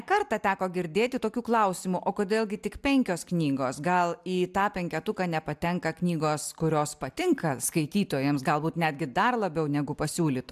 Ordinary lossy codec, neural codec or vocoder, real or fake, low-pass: AAC, 96 kbps; none; real; 14.4 kHz